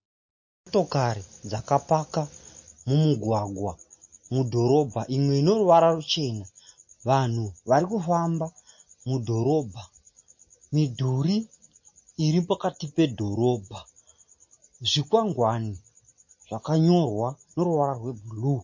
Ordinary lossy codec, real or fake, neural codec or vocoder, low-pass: MP3, 32 kbps; real; none; 7.2 kHz